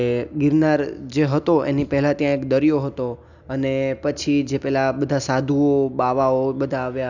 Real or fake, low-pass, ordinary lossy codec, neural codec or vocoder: real; 7.2 kHz; none; none